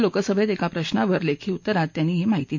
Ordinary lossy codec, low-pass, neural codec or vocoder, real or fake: MP3, 48 kbps; 7.2 kHz; none; real